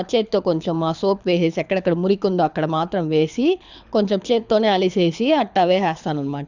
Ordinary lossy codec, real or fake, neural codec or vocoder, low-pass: none; fake; codec, 24 kHz, 6 kbps, HILCodec; 7.2 kHz